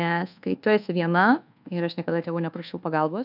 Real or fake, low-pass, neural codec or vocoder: fake; 5.4 kHz; codec, 24 kHz, 1.2 kbps, DualCodec